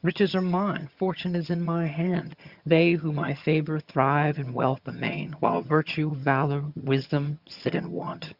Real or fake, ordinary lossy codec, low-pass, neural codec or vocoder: fake; Opus, 64 kbps; 5.4 kHz; vocoder, 22.05 kHz, 80 mel bands, HiFi-GAN